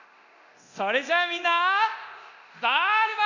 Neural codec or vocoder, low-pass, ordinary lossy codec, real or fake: codec, 24 kHz, 0.9 kbps, DualCodec; 7.2 kHz; none; fake